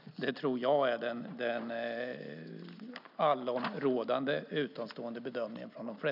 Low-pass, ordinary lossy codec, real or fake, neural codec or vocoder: 5.4 kHz; none; real; none